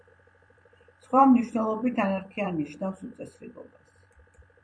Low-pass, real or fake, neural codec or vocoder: 9.9 kHz; fake; vocoder, 44.1 kHz, 128 mel bands every 256 samples, BigVGAN v2